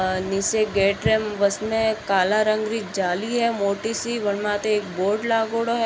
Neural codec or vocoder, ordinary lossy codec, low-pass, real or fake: none; none; none; real